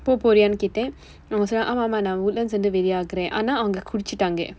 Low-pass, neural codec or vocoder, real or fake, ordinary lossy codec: none; none; real; none